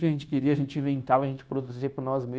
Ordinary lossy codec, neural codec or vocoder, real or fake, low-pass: none; codec, 16 kHz, 0.9 kbps, LongCat-Audio-Codec; fake; none